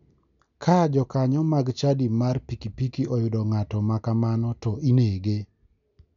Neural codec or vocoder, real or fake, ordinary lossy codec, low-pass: none; real; none; 7.2 kHz